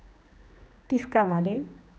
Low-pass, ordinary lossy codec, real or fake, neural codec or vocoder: none; none; fake; codec, 16 kHz, 2 kbps, X-Codec, HuBERT features, trained on general audio